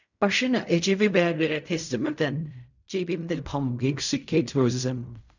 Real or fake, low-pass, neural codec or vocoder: fake; 7.2 kHz; codec, 16 kHz in and 24 kHz out, 0.4 kbps, LongCat-Audio-Codec, fine tuned four codebook decoder